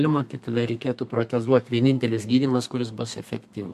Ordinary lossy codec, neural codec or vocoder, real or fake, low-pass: MP3, 64 kbps; codec, 32 kHz, 1.9 kbps, SNAC; fake; 14.4 kHz